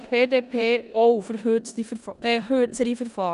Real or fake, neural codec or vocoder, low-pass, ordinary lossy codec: fake; codec, 16 kHz in and 24 kHz out, 0.9 kbps, LongCat-Audio-Codec, four codebook decoder; 10.8 kHz; none